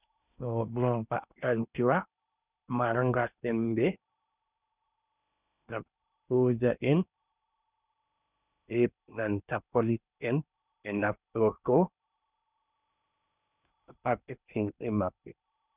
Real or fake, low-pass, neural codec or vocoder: fake; 3.6 kHz; codec, 16 kHz in and 24 kHz out, 0.8 kbps, FocalCodec, streaming, 65536 codes